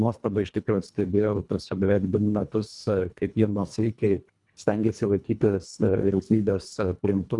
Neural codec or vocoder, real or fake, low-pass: codec, 24 kHz, 1.5 kbps, HILCodec; fake; 10.8 kHz